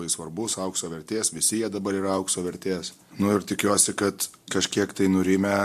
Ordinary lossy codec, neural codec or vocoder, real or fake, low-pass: MP3, 64 kbps; none; real; 14.4 kHz